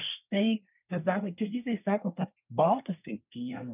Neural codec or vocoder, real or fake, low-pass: codec, 24 kHz, 1 kbps, SNAC; fake; 3.6 kHz